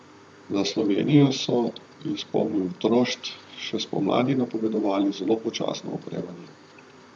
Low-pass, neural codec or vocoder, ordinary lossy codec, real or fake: 9.9 kHz; vocoder, 44.1 kHz, 128 mel bands, Pupu-Vocoder; none; fake